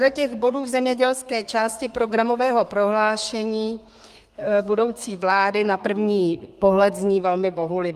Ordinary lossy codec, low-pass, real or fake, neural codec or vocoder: Opus, 32 kbps; 14.4 kHz; fake; codec, 32 kHz, 1.9 kbps, SNAC